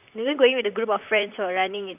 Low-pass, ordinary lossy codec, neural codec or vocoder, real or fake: 3.6 kHz; none; vocoder, 44.1 kHz, 128 mel bands, Pupu-Vocoder; fake